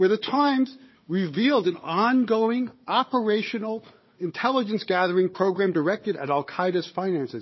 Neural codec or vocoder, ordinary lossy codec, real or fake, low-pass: codec, 16 kHz, 4 kbps, FunCodec, trained on Chinese and English, 50 frames a second; MP3, 24 kbps; fake; 7.2 kHz